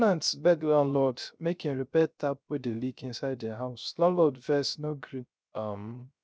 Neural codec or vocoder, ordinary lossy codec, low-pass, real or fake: codec, 16 kHz, 0.3 kbps, FocalCodec; none; none; fake